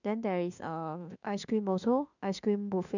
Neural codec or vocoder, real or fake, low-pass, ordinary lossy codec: autoencoder, 48 kHz, 32 numbers a frame, DAC-VAE, trained on Japanese speech; fake; 7.2 kHz; none